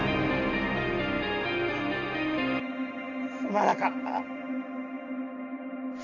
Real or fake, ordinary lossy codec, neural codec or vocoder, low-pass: real; none; none; 7.2 kHz